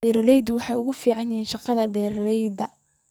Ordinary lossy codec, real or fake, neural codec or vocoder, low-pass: none; fake; codec, 44.1 kHz, 2.6 kbps, SNAC; none